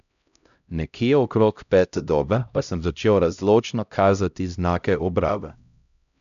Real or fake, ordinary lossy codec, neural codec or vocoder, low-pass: fake; none; codec, 16 kHz, 0.5 kbps, X-Codec, HuBERT features, trained on LibriSpeech; 7.2 kHz